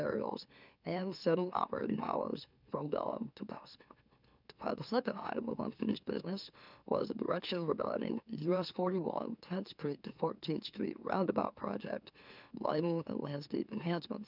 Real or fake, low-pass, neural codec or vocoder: fake; 5.4 kHz; autoencoder, 44.1 kHz, a latent of 192 numbers a frame, MeloTTS